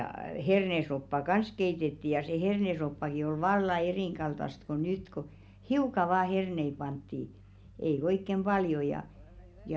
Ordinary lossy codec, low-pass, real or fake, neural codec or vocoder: none; none; real; none